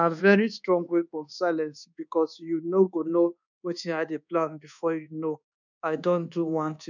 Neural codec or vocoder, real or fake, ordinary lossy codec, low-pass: codec, 24 kHz, 1.2 kbps, DualCodec; fake; none; 7.2 kHz